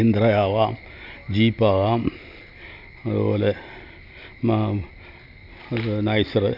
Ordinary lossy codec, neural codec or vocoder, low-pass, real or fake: none; none; 5.4 kHz; real